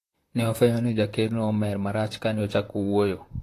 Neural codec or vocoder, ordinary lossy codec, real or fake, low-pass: vocoder, 44.1 kHz, 128 mel bands, Pupu-Vocoder; AAC, 48 kbps; fake; 14.4 kHz